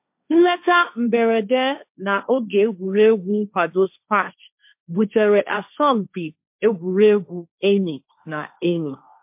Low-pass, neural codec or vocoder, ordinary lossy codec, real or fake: 3.6 kHz; codec, 16 kHz, 1.1 kbps, Voila-Tokenizer; MP3, 32 kbps; fake